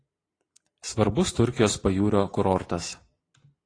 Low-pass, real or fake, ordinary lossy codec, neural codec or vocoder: 9.9 kHz; real; AAC, 32 kbps; none